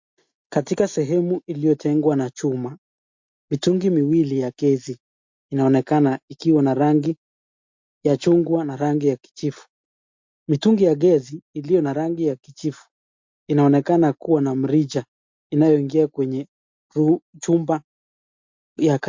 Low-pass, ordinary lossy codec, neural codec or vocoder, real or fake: 7.2 kHz; MP3, 48 kbps; none; real